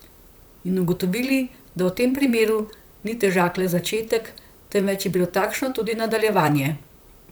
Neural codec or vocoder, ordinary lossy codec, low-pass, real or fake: vocoder, 44.1 kHz, 128 mel bands, Pupu-Vocoder; none; none; fake